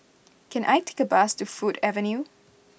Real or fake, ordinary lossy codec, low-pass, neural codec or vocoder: real; none; none; none